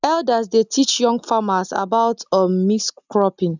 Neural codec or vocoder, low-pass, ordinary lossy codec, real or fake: none; 7.2 kHz; none; real